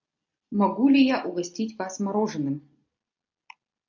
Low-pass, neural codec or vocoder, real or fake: 7.2 kHz; none; real